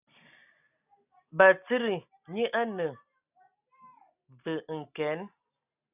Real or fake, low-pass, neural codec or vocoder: real; 3.6 kHz; none